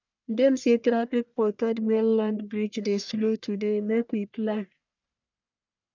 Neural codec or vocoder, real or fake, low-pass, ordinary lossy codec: codec, 44.1 kHz, 1.7 kbps, Pupu-Codec; fake; 7.2 kHz; none